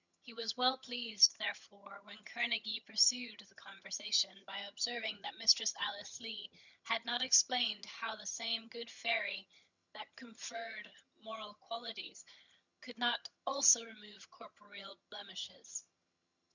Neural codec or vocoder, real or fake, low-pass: vocoder, 22.05 kHz, 80 mel bands, HiFi-GAN; fake; 7.2 kHz